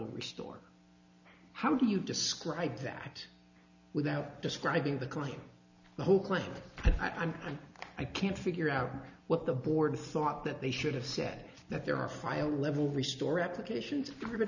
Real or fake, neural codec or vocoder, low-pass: real; none; 7.2 kHz